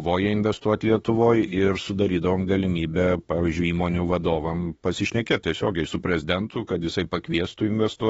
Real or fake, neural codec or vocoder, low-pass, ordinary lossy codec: fake; autoencoder, 48 kHz, 32 numbers a frame, DAC-VAE, trained on Japanese speech; 19.8 kHz; AAC, 24 kbps